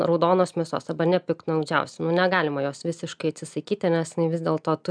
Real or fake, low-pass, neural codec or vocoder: real; 9.9 kHz; none